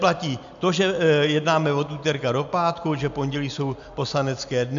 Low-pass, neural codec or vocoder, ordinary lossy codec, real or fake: 7.2 kHz; none; MP3, 64 kbps; real